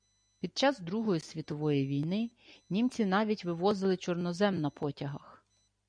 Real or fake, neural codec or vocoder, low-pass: real; none; 9.9 kHz